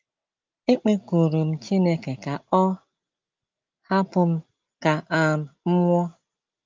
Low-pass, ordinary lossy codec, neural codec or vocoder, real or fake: 7.2 kHz; Opus, 32 kbps; none; real